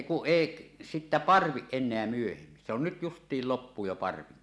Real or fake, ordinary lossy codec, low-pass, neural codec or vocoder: real; none; none; none